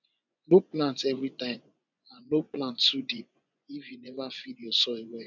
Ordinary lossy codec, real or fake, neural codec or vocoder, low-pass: none; fake; vocoder, 24 kHz, 100 mel bands, Vocos; 7.2 kHz